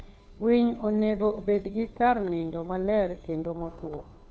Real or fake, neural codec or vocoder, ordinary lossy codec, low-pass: fake; codec, 16 kHz, 2 kbps, FunCodec, trained on Chinese and English, 25 frames a second; none; none